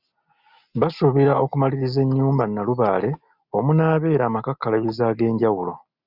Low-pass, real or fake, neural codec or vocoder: 5.4 kHz; real; none